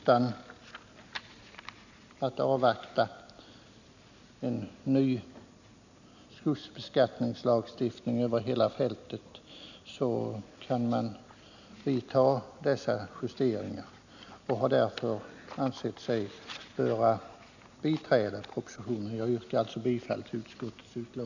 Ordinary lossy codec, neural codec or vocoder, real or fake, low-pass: none; none; real; 7.2 kHz